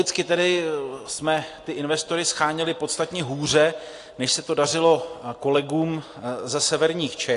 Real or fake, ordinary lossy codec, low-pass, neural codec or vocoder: real; AAC, 48 kbps; 10.8 kHz; none